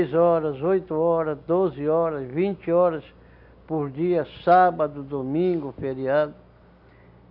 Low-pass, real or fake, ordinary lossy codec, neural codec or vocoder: 5.4 kHz; real; none; none